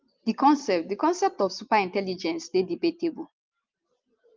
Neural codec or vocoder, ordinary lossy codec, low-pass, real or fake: none; Opus, 24 kbps; 7.2 kHz; real